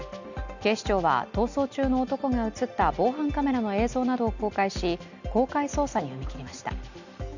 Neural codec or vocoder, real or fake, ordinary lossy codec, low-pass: none; real; none; 7.2 kHz